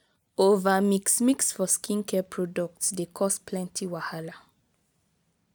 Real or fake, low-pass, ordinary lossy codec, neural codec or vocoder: real; none; none; none